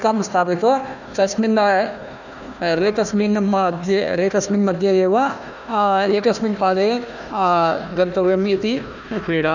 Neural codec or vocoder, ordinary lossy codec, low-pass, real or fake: codec, 16 kHz, 1 kbps, FunCodec, trained on Chinese and English, 50 frames a second; none; 7.2 kHz; fake